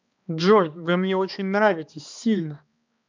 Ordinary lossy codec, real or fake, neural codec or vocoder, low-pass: MP3, 64 kbps; fake; codec, 16 kHz, 2 kbps, X-Codec, HuBERT features, trained on balanced general audio; 7.2 kHz